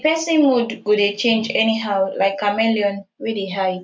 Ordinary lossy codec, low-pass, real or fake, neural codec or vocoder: none; none; real; none